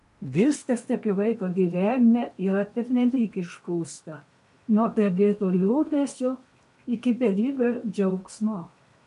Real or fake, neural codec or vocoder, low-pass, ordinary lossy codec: fake; codec, 16 kHz in and 24 kHz out, 0.8 kbps, FocalCodec, streaming, 65536 codes; 10.8 kHz; MP3, 64 kbps